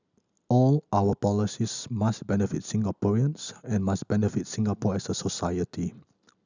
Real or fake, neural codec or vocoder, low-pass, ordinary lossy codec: real; none; 7.2 kHz; none